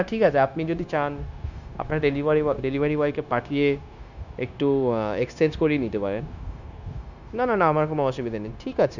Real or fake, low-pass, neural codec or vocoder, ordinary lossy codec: fake; 7.2 kHz; codec, 16 kHz, 0.9 kbps, LongCat-Audio-Codec; none